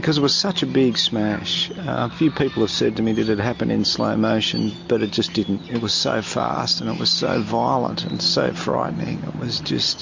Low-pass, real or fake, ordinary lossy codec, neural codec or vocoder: 7.2 kHz; real; MP3, 48 kbps; none